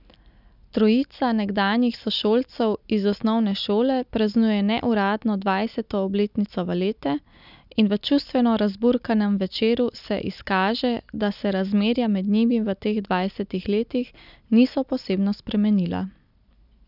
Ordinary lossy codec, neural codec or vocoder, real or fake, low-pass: none; none; real; 5.4 kHz